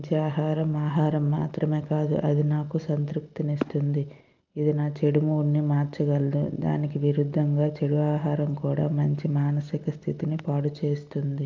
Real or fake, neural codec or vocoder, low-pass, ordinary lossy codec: real; none; 7.2 kHz; Opus, 24 kbps